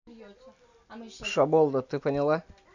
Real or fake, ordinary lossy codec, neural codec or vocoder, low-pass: real; none; none; 7.2 kHz